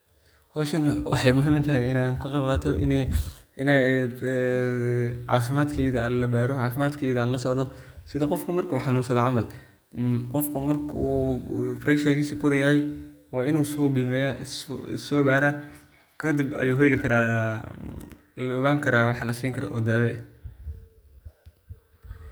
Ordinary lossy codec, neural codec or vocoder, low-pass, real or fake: none; codec, 44.1 kHz, 2.6 kbps, SNAC; none; fake